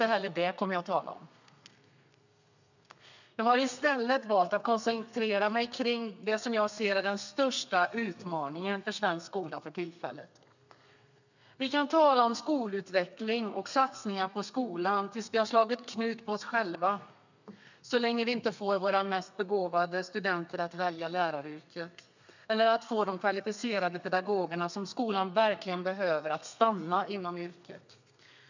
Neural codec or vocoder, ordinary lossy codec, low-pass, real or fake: codec, 32 kHz, 1.9 kbps, SNAC; none; 7.2 kHz; fake